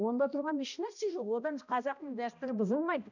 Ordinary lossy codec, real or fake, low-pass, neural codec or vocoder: none; fake; 7.2 kHz; codec, 16 kHz, 1 kbps, X-Codec, HuBERT features, trained on balanced general audio